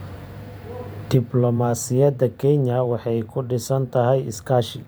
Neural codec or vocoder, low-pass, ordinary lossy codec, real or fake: vocoder, 44.1 kHz, 128 mel bands every 512 samples, BigVGAN v2; none; none; fake